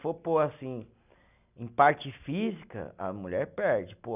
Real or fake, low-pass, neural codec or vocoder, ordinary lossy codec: real; 3.6 kHz; none; none